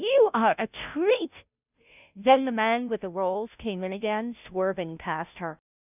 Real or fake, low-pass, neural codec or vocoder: fake; 3.6 kHz; codec, 16 kHz, 0.5 kbps, FunCodec, trained on Chinese and English, 25 frames a second